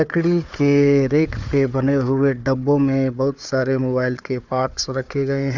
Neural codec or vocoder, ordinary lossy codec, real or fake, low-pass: codec, 16 kHz, 4 kbps, FunCodec, trained on Chinese and English, 50 frames a second; none; fake; 7.2 kHz